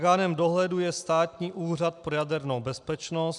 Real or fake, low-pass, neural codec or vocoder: real; 10.8 kHz; none